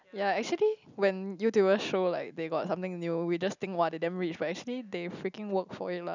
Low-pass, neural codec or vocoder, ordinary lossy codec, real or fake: 7.2 kHz; none; none; real